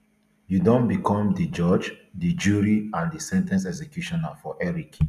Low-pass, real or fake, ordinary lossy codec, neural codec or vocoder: 14.4 kHz; real; none; none